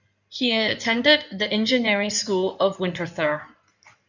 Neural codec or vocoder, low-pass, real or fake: codec, 16 kHz in and 24 kHz out, 2.2 kbps, FireRedTTS-2 codec; 7.2 kHz; fake